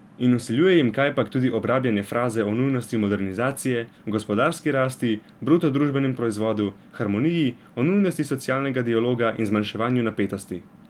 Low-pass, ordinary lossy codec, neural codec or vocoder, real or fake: 19.8 kHz; Opus, 24 kbps; none; real